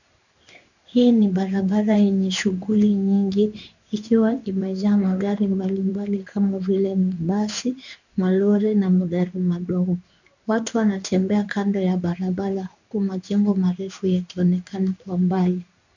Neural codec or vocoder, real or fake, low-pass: codec, 16 kHz in and 24 kHz out, 1 kbps, XY-Tokenizer; fake; 7.2 kHz